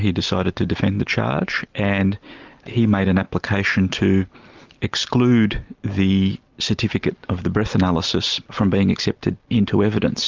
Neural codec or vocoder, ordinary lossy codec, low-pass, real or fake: none; Opus, 32 kbps; 7.2 kHz; real